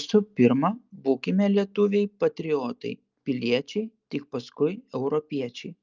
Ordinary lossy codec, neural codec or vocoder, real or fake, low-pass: Opus, 24 kbps; none; real; 7.2 kHz